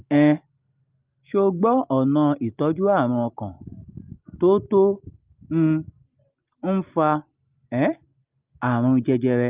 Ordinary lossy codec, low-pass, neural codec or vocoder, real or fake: Opus, 24 kbps; 3.6 kHz; none; real